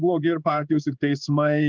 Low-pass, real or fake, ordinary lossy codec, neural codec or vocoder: 7.2 kHz; fake; Opus, 24 kbps; codec, 16 kHz in and 24 kHz out, 1 kbps, XY-Tokenizer